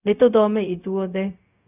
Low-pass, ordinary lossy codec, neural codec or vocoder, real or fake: 3.6 kHz; none; codec, 16 kHz, 0.4 kbps, LongCat-Audio-Codec; fake